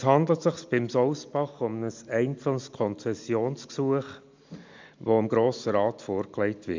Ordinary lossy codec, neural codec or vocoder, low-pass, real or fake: MP3, 64 kbps; none; 7.2 kHz; real